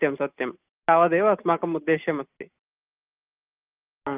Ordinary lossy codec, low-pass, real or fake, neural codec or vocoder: Opus, 32 kbps; 3.6 kHz; real; none